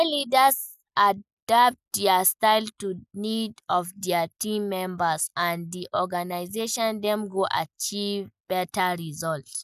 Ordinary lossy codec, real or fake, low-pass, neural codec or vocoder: none; real; 14.4 kHz; none